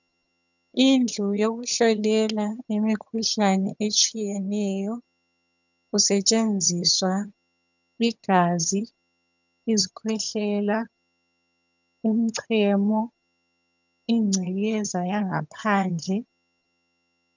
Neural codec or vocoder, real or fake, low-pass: vocoder, 22.05 kHz, 80 mel bands, HiFi-GAN; fake; 7.2 kHz